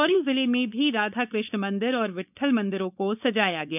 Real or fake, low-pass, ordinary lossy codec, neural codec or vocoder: fake; 3.6 kHz; none; codec, 16 kHz, 4.8 kbps, FACodec